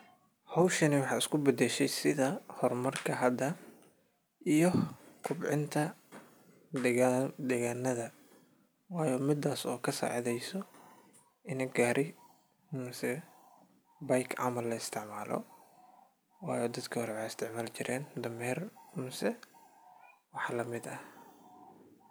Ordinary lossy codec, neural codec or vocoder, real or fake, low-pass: none; none; real; none